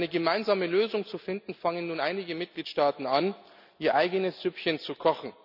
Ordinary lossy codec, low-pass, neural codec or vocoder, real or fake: none; 5.4 kHz; none; real